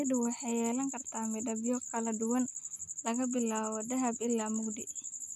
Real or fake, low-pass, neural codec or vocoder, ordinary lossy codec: real; 14.4 kHz; none; none